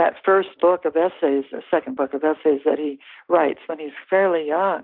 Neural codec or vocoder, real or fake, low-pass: none; real; 5.4 kHz